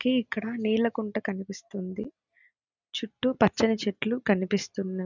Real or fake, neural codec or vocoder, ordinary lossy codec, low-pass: real; none; none; 7.2 kHz